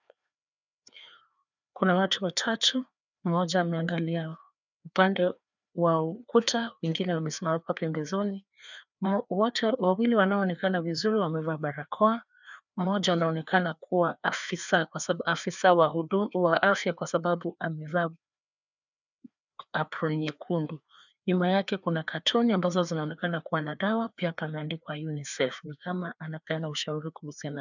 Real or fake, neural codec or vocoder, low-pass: fake; codec, 16 kHz, 2 kbps, FreqCodec, larger model; 7.2 kHz